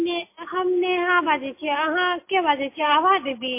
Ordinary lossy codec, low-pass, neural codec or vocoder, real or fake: MP3, 24 kbps; 3.6 kHz; none; real